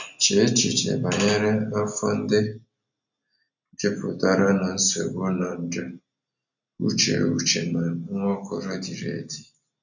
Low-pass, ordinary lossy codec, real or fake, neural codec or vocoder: 7.2 kHz; none; real; none